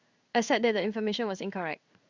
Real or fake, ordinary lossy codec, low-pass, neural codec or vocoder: fake; Opus, 64 kbps; 7.2 kHz; codec, 16 kHz in and 24 kHz out, 1 kbps, XY-Tokenizer